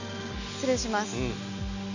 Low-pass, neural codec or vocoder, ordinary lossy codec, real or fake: 7.2 kHz; none; none; real